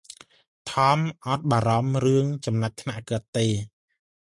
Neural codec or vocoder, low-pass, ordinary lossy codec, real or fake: none; 10.8 kHz; MP3, 64 kbps; real